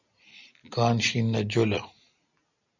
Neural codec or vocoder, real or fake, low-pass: none; real; 7.2 kHz